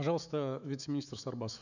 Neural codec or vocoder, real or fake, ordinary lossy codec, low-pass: autoencoder, 48 kHz, 128 numbers a frame, DAC-VAE, trained on Japanese speech; fake; none; 7.2 kHz